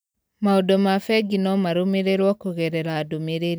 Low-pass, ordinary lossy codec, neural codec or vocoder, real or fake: none; none; none; real